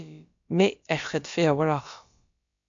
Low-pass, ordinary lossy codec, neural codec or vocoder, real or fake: 7.2 kHz; AAC, 64 kbps; codec, 16 kHz, about 1 kbps, DyCAST, with the encoder's durations; fake